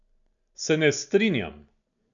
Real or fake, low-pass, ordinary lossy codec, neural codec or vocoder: real; 7.2 kHz; none; none